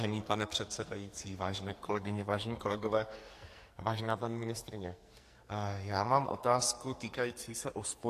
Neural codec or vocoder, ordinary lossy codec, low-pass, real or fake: codec, 44.1 kHz, 2.6 kbps, SNAC; AAC, 64 kbps; 14.4 kHz; fake